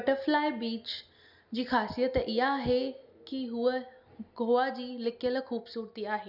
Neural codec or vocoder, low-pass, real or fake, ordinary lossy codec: none; 5.4 kHz; real; none